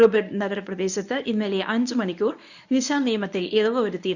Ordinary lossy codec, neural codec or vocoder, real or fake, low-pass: none; codec, 24 kHz, 0.9 kbps, WavTokenizer, medium speech release version 1; fake; 7.2 kHz